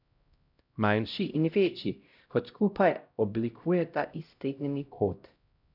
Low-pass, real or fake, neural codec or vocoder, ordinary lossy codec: 5.4 kHz; fake; codec, 16 kHz, 0.5 kbps, X-Codec, HuBERT features, trained on LibriSpeech; none